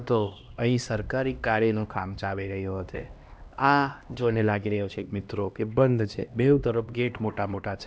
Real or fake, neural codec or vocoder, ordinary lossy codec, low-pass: fake; codec, 16 kHz, 1 kbps, X-Codec, HuBERT features, trained on LibriSpeech; none; none